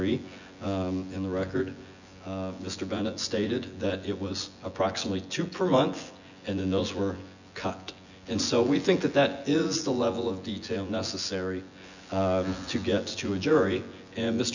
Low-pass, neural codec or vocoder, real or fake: 7.2 kHz; vocoder, 24 kHz, 100 mel bands, Vocos; fake